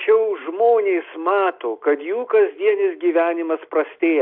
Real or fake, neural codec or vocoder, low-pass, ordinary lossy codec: real; none; 5.4 kHz; AAC, 48 kbps